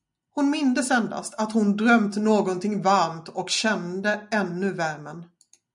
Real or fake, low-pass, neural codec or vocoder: real; 10.8 kHz; none